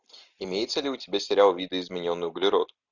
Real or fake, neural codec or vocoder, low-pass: real; none; 7.2 kHz